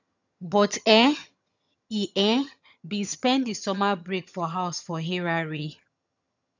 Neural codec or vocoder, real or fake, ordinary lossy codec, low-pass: vocoder, 22.05 kHz, 80 mel bands, HiFi-GAN; fake; none; 7.2 kHz